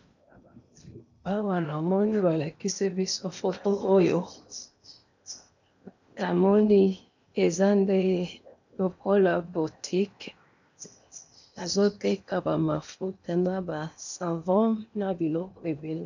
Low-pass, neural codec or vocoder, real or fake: 7.2 kHz; codec, 16 kHz in and 24 kHz out, 0.8 kbps, FocalCodec, streaming, 65536 codes; fake